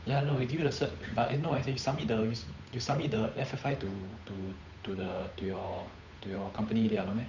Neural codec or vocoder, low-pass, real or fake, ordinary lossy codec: codec, 16 kHz, 8 kbps, FunCodec, trained on Chinese and English, 25 frames a second; 7.2 kHz; fake; none